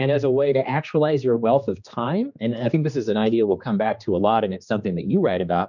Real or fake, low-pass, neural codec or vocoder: fake; 7.2 kHz; codec, 16 kHz, 2 kbps, X-Codec, HuBERT features, trained on general audio